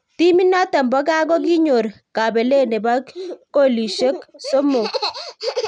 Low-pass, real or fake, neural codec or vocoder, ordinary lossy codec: 9.9 kHz; real; none; none